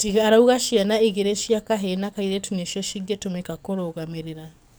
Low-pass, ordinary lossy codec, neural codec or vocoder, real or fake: none; none; codec, 44.1 kHz, 7.8 kbps, DAC; fake